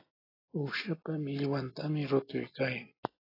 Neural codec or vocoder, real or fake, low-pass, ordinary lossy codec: none; real; 5.4 kHz; AAC, 24 kbps